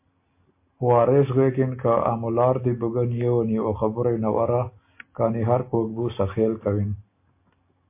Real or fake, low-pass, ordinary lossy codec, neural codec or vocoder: real; 3.6 kHz; MP3, 24 kbps; none